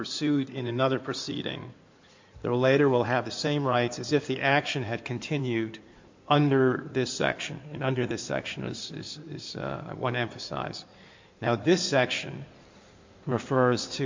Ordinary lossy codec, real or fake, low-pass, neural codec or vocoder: MP3, 48 kbps; fake; 7.2 kHz; codec, 16 kHz in and 24 kHz out, 2.2 kbps, FireRedTTS-2 codec